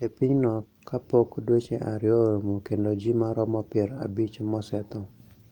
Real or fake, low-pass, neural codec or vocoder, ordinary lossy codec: fake; 19.8 kHz; vocoder, 44.1 kHz, 128 mel bands every 256 samples, BigVGAN v2; Opus, 32 kbps